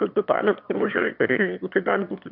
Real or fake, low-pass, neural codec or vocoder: fake; 5.4 kHz; autoencoder, 22.05 kHz, a latent of 192 numbers a frame, VITS, trained on one speaker